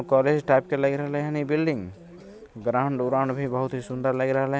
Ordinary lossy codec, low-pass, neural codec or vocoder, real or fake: none; none; none; real